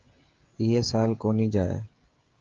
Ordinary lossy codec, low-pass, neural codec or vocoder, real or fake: Opus, 32 kbps; 7.2 kHz; codec, 16 kHz, 16 kbps, FreqCodec, smaller model; fake